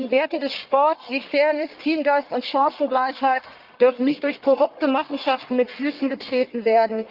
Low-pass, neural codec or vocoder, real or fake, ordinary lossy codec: 5.4 kHz; codec, 44.1 kHz, 1.7 kbps, Pupu-Codec; fake; Opus, 32 kbps